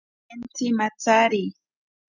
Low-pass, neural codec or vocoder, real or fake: 7.2 kHz; none; real